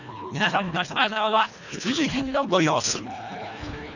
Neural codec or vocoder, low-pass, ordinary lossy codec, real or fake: codec, 24 kHz, 1.5 kbps, HILCodec; 7.2 kHz; none; fake